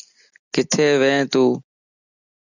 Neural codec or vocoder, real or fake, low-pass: none; real; 7.2 kHz